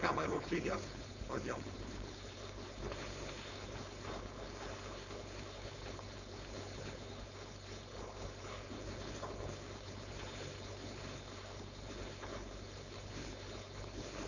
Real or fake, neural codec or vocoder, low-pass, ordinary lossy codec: fake; codec, 16 kHz, 4.8 kbps, FACodec; 7.2 kHz; none